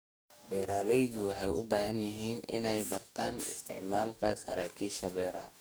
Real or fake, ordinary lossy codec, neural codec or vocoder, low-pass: fake; none; codec, 44.1 kHz, 2.6 kbps, DAC; none